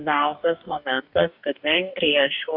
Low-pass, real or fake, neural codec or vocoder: 5.4 kHz; fake; codec, 44.1 kHz, 2.6 kbps, DAC